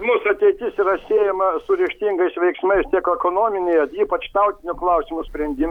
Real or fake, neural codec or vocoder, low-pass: real; none; 19.8 kHz